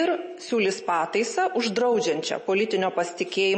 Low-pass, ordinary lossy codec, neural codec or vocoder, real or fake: 10.8 kHz; MP3, 32 kbps; none; real